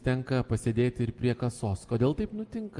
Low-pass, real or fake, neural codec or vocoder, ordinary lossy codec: 10.8 kHz; real; none; Opus, 24 kbps